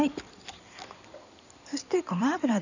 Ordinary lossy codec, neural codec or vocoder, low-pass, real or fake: none; codec, 16 kHz in and 24 kHz out, 2.2 kbps, FireRedTTS-2 codec; 7.2 kHz; fake